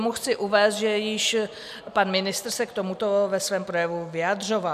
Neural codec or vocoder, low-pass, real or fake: none; 14.4 kHz; real